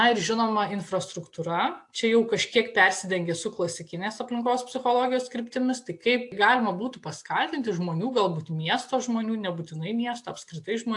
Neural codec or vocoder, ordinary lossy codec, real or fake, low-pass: none; AAC, 64 kbps; real; 10.8 kHz